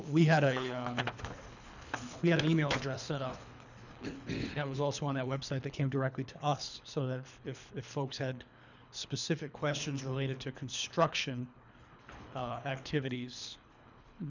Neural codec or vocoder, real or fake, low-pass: codec, 24 kHz, 3 kbps, HILCodec; fake; 7.2 kHz